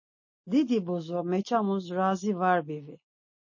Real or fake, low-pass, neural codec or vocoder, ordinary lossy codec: real; 7.2 kHz; none; MP3, 32 kbps